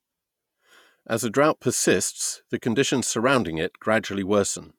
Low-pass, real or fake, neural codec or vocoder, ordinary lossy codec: 19.8 kHz; fake; vocoder, 48 kHz, 128 mel bands, Vocos; none